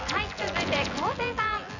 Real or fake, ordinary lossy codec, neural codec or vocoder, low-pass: fake; none; vocoder, 24 kHz, 100 mel bands, Vocos; 7.2 kHz